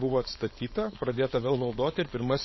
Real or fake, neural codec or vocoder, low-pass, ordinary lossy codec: fake; codec, 16 kHz, 4.8 kbps, FACodec; 7.2 kHz; MP3, 24 kbps